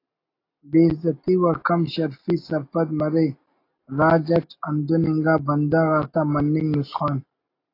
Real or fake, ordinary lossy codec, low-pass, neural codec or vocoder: real; AAC, 32 kbps; 5.4 kHz; none